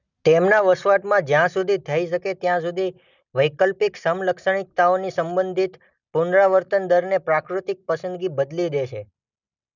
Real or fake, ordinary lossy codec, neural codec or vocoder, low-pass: real; none; none; 7.2 kHz